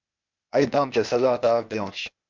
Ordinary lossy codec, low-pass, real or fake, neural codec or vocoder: MP3, 64 kbps; 7.2 kHz; fake; codec, 16 kHz, 0.8 kbps, ZipCodec